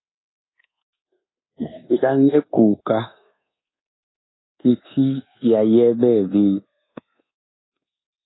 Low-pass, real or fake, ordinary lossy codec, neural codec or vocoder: 7.2 kHz; fake; AAC, 16 kbps; codec, 24 kHz, 1.2 kbps, DualCodec